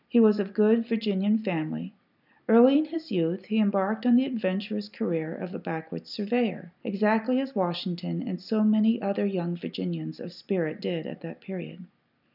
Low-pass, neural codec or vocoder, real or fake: 5.4 kHz; none; real